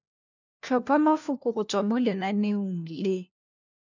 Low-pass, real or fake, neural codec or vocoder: 7.2 kHz; fake; codec, 16 kHz, 1 kbps, FunCodec, trained on LibriTTS, 50 frames a second